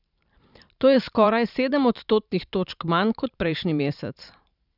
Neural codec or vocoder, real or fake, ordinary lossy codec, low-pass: vocoder, 44.1 kHz, 128 mel bands every 512 samples, BigVGAN v2; fake; none; 5.4 kHz